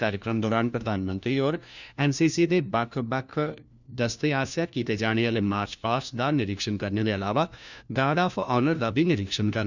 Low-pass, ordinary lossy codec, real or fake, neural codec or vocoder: 7.2 kHz; none; fake; codec, 16 kHz, 1 kbps, FunCodec, trained on LibriTTS, 50 frames a second